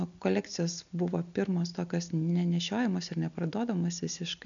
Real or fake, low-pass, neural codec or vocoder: real; 7.2 kHz; none